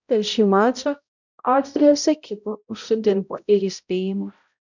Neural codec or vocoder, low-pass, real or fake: codec, 16 kHz, 0.5 kbps, X-Codec, HuBERT features, trained on balanced general audio; 7.2 kHz; fake